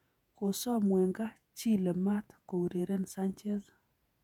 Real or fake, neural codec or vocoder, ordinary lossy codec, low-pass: fake; vocoder, 48 kHz, 128 mel bands, Vocos; none; 19.8 kHz